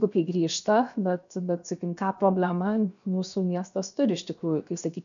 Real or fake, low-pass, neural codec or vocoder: fake; 7.2 kHz; codec, 16 kHz, 0.7 kbps, FocalCodec